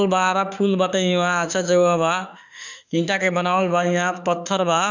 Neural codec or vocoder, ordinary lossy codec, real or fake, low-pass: autoencoder, 48 kHz, 32 numbers a frame, DAC-VAE, trained on Japanese speech; none; fake; 7.2 kHz